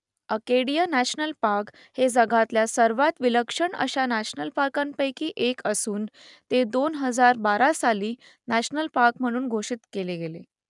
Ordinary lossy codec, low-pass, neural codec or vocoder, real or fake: none; 10.8 kHz; none; real